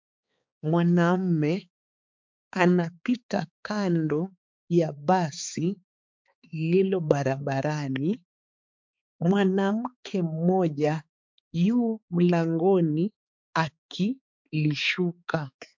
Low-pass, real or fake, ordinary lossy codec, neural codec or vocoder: 7.2 kHz; fake; MP3, 64 kbps; codec, 16 kHz, 4 kbps, X-Codec, HuBERT features, trained on balanced general audio